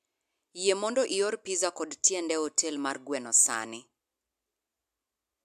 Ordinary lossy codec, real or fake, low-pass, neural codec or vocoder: AAC, 96 kbps; real; 14.4 kHz; none